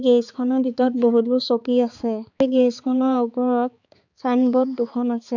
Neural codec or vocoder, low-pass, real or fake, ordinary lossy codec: codec, 16 kHz, 4 kbps, X-Codec, HuBERT features, trained on balanced general audio; 7.2 kHz; fake; none